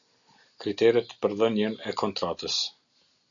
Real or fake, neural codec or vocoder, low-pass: real; none; 7.2 kHz